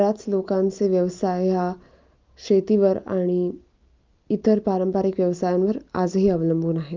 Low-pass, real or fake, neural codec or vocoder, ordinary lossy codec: 7.2 kHz; real; none; Opus, 24 kbps